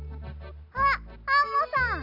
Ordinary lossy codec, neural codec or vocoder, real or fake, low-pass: none; none; real; 5.4 kHz